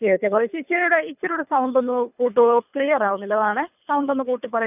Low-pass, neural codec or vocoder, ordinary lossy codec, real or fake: 3.6 kHz; codec, 24 kHz, 3 kbps, HILCodec; none; fake